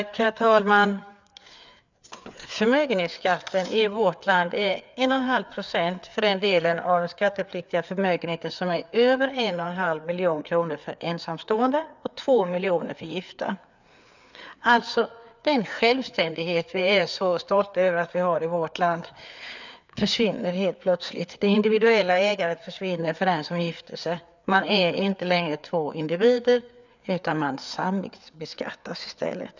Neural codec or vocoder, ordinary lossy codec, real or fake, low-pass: codec, 16 kHz, 4 kbps, FreqCodec, larger model; none; fake; 7.2 kHz